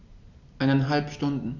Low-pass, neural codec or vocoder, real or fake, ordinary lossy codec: 7.2 kHz; none; real; none